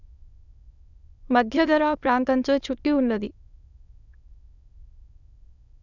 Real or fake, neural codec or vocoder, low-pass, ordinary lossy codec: fake; autoencoder, 22.05 kHz, a latent of 192 numbers a frame, VITS, trained on many speakers; 7.2 kHz; none